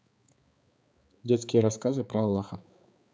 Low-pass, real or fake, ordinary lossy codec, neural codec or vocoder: none; fake; none; codec, 16 kHz, 4 kbps, X-Codec, HuBERT features, trained on balanced general audio